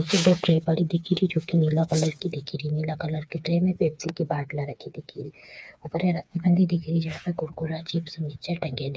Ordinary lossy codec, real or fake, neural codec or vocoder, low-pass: none; fake; codec, 16 kHz, 4 kbps, FreqCodec, smaller model; none